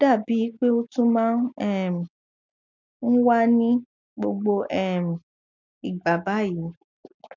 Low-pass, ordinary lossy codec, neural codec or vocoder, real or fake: 7.2 kHz; none; none; real